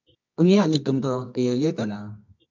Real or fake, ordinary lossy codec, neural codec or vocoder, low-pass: fake; MP3, 64 kbps; codec, 24 kHz, 0.9 kbps, WavTokenizer, medium music audio release; 7.2 kHz